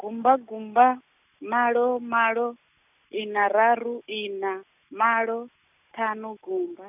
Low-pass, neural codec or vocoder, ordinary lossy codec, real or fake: 3.6 kHz; none; none; real